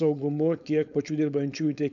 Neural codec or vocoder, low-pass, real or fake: codec, 16 kHz, 4.8 kbps, FACodec; 7.2 kHz; fake